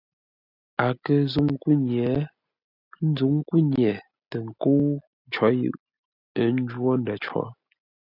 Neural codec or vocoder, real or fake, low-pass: none; real; 5.4 kHz